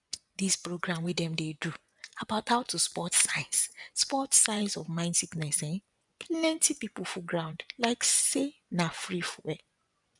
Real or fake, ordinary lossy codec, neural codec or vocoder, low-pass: fake; none; vocoder, 24 kHz, 100 mel bands, Vocos; 10.8 kHz